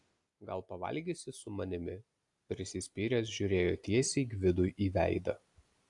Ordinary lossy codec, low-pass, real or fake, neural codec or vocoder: AAC, 64 kbps; 10.8 kHz; real; none